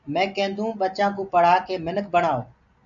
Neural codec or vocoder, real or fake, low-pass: none; real; 7.2 kHz